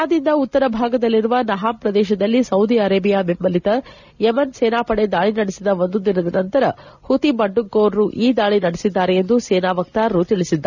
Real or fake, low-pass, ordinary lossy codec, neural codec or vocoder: real; 7.2 kHz; none; none